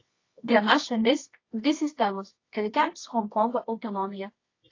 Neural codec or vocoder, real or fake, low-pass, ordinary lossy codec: codec, 24 kHz, 0.9 kbps, WavTokenizer, medium music audio release; fake; 7.2 kHz; AAC, 32 kbps